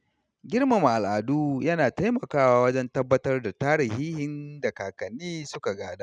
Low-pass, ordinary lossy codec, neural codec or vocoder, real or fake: 9.9 kHz; none; none; real